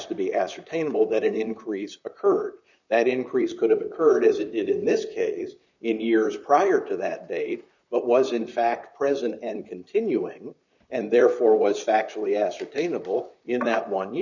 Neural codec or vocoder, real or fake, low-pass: vocoder, 44.1 kHz, 128 mel bands, Pupu-Vocoder; fake; 7.2 kHz